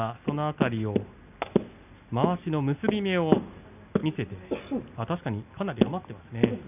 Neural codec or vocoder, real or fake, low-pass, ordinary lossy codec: none; real; 3.6 kHz; none